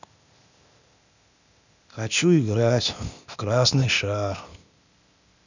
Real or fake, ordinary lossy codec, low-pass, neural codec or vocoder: fake; none; 7.2 kHz; codec, 16 kHz, 0.8 kbps, ZipCodec